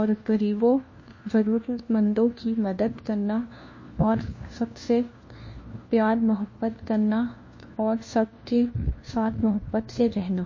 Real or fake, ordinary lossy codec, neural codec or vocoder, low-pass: fake; MP3, 32 kbps; codec, 16 kHz, 1 kbps, FunCodec, trained on LibriTTS, 50 frames a second; 7.2 kHz